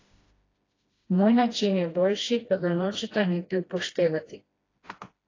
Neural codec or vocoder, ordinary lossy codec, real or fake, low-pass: codec, 16 kHz, 1 kbps, FreqCodec, smaller model; AAC, 32 kbps; fake; 7.2 kHz